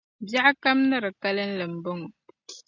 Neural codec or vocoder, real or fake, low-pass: none; real; 7.2 kHz